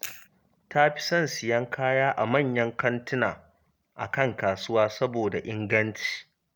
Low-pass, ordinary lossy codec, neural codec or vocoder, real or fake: none; none; none; real